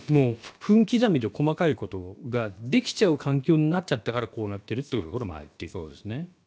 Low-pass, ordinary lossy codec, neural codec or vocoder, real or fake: none; none; codec, 16 kHz, about 1 kbps, DyCAST, with the encoder's durations; fake